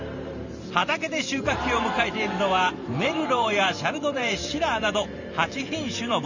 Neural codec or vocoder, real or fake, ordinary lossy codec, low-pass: vocoder, 44.1 kHz, 128 mel bands every 512 samples, BigVGAN v2; fake; none; 7.2 kHz